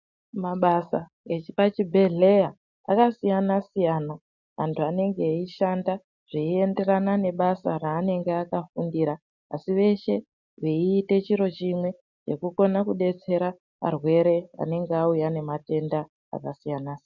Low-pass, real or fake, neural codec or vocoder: 7.2 kHz; real; none